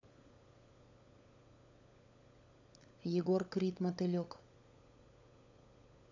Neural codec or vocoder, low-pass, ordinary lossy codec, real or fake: codec, 16 kHz, 16 kbps, FunCodec, trained on LibriTTS, 50 frames a second; 7.2 kHz; AAC, 48 kbps; fake